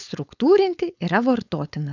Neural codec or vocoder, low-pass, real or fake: codec, 16 kHz, 4.8 kbps, FACodec; 7.2 kHz; fake